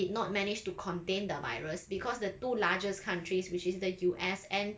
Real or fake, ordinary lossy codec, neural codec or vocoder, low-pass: real; none; none; none